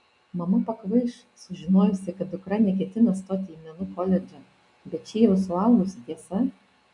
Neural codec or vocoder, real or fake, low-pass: none; real; 10.8 kHz